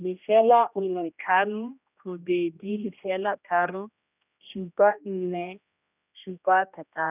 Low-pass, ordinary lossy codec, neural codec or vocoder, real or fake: 3.6 kHz; none; codec, 16 kHz, 1 kbps, X-Codec, HuBERT features, trained on general audio; fake